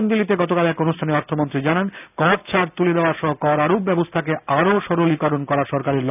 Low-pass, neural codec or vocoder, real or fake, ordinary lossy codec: 3.6 kHz; none; real; none